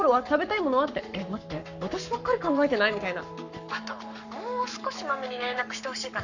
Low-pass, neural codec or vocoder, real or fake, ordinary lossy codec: 7.2 kHz; codec, 44.1 kHz, 7.8 kbps, Pupu-Codec; fake; none